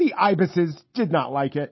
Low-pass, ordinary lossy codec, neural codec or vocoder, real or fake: 7.2 kHz; MP3, 24 kbps; none; real